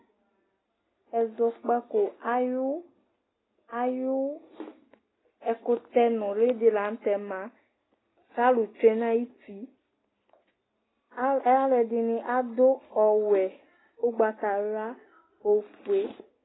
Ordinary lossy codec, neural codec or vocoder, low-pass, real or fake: AAC, 16 kbps; none; 7.2 kHz; real